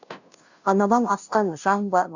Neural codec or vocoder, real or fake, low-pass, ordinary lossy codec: codec, 16 kHz, 0.5 kbps, FunCodec, trained on Chinese and English, 25 frames a second; fake; 7.2 kHz; none